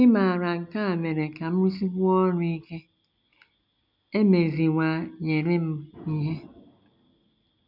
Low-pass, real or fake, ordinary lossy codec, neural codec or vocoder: 5.4 kHz; real; none; none